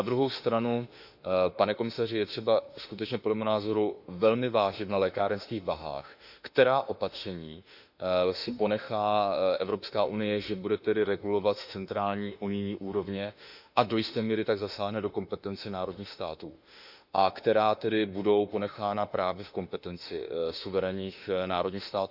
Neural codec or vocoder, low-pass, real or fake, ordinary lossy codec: autoencoder, 48 kHz, 32 numbers a frame, DAC-VAE, trained on Japanese speech; 5.4 kHz; fake; none